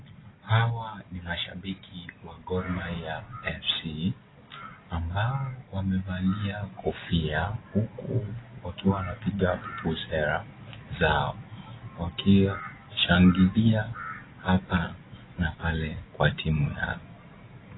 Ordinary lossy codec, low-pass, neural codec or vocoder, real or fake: AAC, 16 kbps; 7.2 kHz; none; real